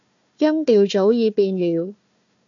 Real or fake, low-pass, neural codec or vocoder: fake; 7.2 kHz; codec, 16 kHz, 1 kbps, FunCodec, trained on Chinese and English, 50 frames a second